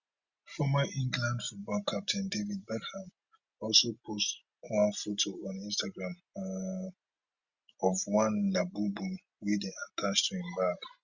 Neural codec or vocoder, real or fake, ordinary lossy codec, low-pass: none; real; Opus, 64 kbps; 7.2 kHz